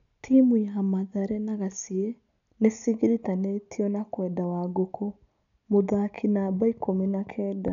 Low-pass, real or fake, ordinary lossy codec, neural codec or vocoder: 7.2 kHz; real; MP3, 96 kbps; none